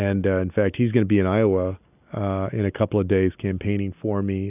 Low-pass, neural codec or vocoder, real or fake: 3.6 kHz; none; real